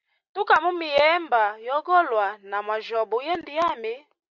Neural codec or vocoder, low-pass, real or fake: none; 7.2 kHz; real